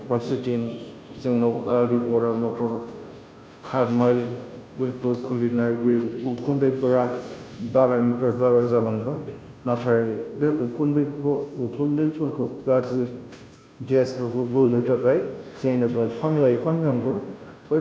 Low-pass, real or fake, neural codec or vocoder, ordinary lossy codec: none; fake; codec, 16 kHz, 0.5 kbps, FunCodec, trained on Chinese and English, 25 frames a second; none